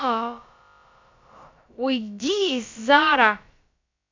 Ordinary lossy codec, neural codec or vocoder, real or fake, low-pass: MP3, 64 kbps; codec, 16 kHz, about 1 kbps, DyCAST, with the encoder's durations; fake; 7.2 kHz